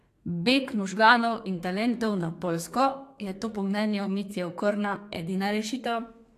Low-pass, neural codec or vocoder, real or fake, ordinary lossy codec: 14.4 kHz; codec, 32 kHz, 1.9 kbps, SNAC; fake; AAC, 64 kbps